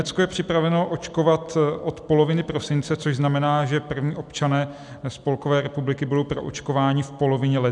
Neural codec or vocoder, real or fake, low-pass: none; real; 10.8 kHz